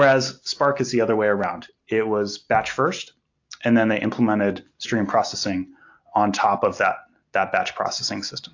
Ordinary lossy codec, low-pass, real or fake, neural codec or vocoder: AAC, 48 kbps; 7.2 kHz; real; none